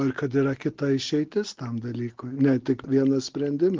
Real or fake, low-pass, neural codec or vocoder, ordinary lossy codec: real; 7.2 kHz; none; Opus, 16 kbps